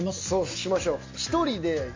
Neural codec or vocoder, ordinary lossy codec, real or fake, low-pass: none; MP3, 48 kbps; real; 7.2 kHz